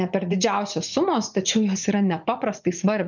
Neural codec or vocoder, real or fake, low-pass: vocoder, 44.1 kHz, 80 mel bands, Vocos; fake; 7.2 kHz